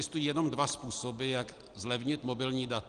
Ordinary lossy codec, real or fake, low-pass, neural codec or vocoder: Opus, 24 kbps; real; 9.9 kHz; none